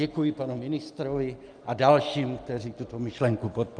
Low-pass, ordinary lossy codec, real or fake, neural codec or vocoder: 9.9 kHz; Opus, 32 kbps; real; none